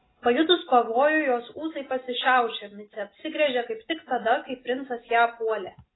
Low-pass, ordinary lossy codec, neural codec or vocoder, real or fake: 7.2 kHz; AAC, 16 kbps; none; real